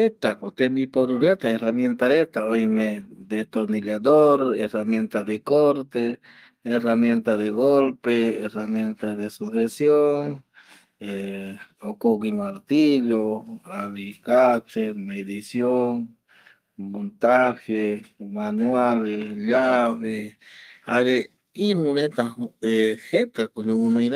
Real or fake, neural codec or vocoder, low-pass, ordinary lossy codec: fake; codec, 32 kHz, 1.9 kbps, SNAC; 14.4 kHz; Opus, 32 kbps